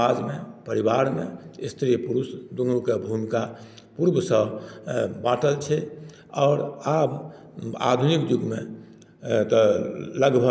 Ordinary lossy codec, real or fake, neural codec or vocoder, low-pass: none; real; none; none